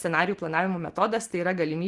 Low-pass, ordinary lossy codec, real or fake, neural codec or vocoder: 10.8 kHz; Opus, 24 kbps; real; none